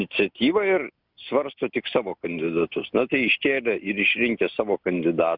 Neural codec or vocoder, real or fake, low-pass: none; real; 5.4 kHz